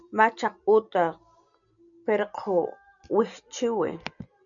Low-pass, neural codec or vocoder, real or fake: 7.2 kHz; none; real